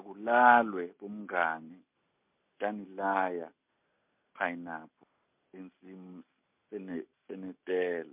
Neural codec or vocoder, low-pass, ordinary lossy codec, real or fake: none; 3.6 kHz; MP3, 32 kbps; real